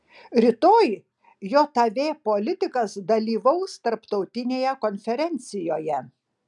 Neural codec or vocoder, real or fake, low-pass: none; real; 10.8 kHz